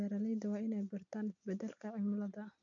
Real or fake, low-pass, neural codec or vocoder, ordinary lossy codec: real; 7.2 kHz; none; none